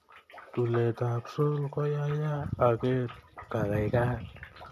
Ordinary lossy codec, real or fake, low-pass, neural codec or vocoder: MP3, 64 kbps; real; 14.4 kHz; none